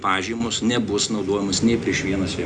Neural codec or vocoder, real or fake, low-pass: none; real; 9.9 kHz